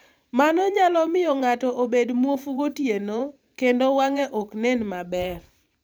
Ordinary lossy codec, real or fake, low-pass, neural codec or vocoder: none; fake; none; vocoder, 44.1 kHz, 128 mel bands every 512 samples, BigVGAN v2